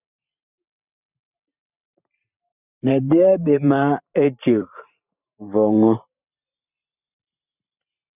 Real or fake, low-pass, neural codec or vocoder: fake; 3.6 kHz; vocoder, 44.1 kHz, 128 mel bands every 512 samples, BigVGAN v2